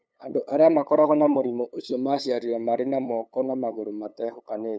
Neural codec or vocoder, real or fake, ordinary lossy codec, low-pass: codec, 16 kHz, 8 kbps, FunCodec, trained on LibriTTS, 25 frames a second; fake; none; none